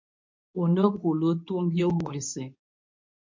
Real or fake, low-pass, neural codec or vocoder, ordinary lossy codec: fake; 7.2 kHz; codec, 24 kHz, 0.9 kbps, WavTokenizer, medium speech release version 2; MP3, 48 kbps